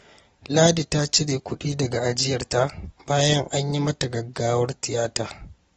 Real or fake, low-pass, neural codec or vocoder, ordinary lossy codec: real; 19.8 kHz; none; AAC, 24 kbps